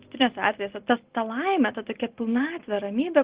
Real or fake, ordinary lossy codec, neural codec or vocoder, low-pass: real; Opus, 16 kbps; none; 3.6 kHz